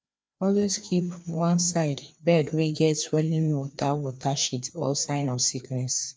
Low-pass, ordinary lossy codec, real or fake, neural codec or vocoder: none; none; fake; codec, 16 kHz, 2 kbps, FreqCodec, larger model